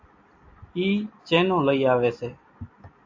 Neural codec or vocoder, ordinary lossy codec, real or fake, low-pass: none; AAC, 48 kbps; real; 7.2 kHz